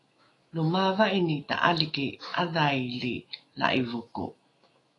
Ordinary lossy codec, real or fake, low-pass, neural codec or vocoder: AAC, 32 kbps; fake; 10.8 kHz; autoencoder, 48 kHz, 128 numbers a frame, DAC-VAE, trained on Japanese speech